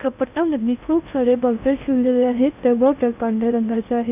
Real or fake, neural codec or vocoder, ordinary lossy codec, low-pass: fake; codec, 16 kHz in and 24 kHz out, 0.6 kbps, FocalCodec, streaming, 2048 codes; none; 3.6 kHz